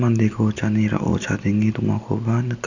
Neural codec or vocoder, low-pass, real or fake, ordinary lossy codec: none; 7.2 kHz; real; none